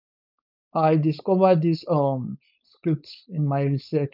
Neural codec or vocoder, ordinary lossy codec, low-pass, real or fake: codec, 16 kHz, 4.8 kbps, FACodec; none; 5.4 kHz; fake